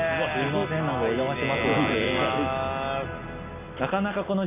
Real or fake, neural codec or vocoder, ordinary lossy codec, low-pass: real; none; none; 3.6 kHz